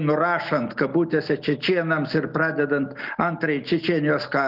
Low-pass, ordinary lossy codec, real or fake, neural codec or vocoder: 5.4 kHz; Opus, 24 kbps; real; none